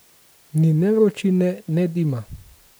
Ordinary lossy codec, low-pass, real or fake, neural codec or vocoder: none; none; real; none